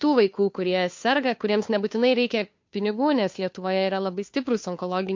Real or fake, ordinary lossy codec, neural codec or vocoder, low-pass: fake; MP3, 48 kbps; codec, 16 kHz, 2 kbps, FunCodec, trained on Chinese and English, 25 frames a second; 7.2 kHz